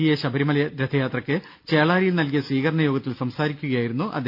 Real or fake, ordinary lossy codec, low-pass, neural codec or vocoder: real; none; 5.4 kHz; none